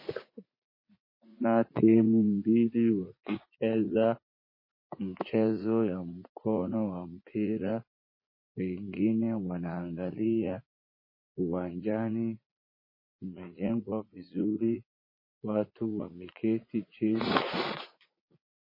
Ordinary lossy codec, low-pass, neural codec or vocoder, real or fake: MP3, 24 kbps; 5.4 kHz; vocoder, 44.1 kHz, 80 mel bands, Vocos; fake